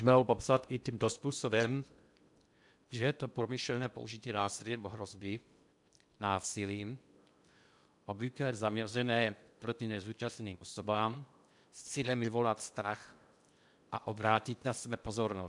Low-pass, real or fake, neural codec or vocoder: 10.8 kHz; fake; codec, 16 kHz in and 24 kHz out, 0.6 kbps, FocalCodec, streaming, 2048 codes